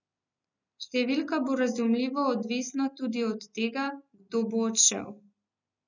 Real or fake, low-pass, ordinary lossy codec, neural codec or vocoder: real; 7.2 kHz; none; none